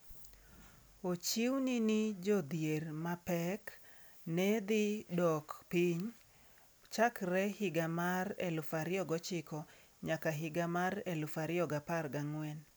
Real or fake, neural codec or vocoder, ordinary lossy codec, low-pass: real; none; none; none